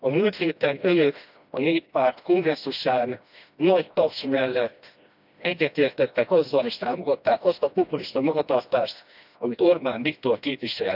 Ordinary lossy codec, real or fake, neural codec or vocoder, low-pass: none; fake; codec, 16 kHz, 1 kbps, FreqCodec, smaller model; 5.4 kHz